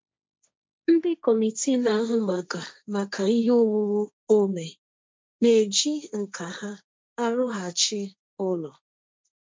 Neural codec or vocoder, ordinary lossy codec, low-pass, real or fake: codec, 16 kHz, 1.1 kbps, Voila-Tokenizer; none; none; fake